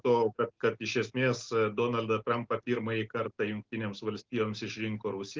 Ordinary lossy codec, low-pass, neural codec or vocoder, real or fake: Opus, 16 kbps; 7.2 kHz; none; real